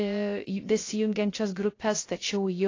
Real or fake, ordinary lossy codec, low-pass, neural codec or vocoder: fake; AAC, 32 kbps; 7.2 kHz; codec, 16 kHz, 0.3 kbps, FocalCodec